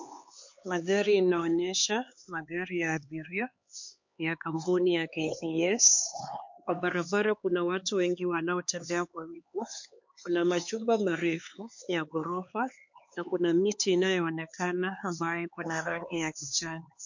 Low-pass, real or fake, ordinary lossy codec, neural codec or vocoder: 7.2 kHz; fake; MP3, 48 kbps; codec, 16 kHz, 4 kbps, X-Codec, HuBERT features, trained on LibriSpeech